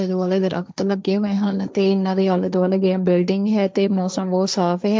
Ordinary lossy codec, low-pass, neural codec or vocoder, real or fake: none; none; codec, 16 kHz, 1.1 kbps, Voila-Tokenizer; fake